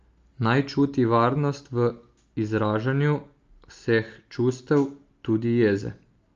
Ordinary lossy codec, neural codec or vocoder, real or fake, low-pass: Opus, 32 kbps; none; real; 7.2 kHz